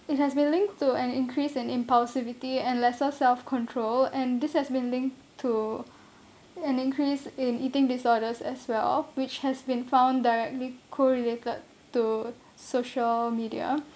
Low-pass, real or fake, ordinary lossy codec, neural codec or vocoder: none; real; none; none